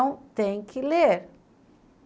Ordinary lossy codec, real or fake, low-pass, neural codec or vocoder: none; real; none; none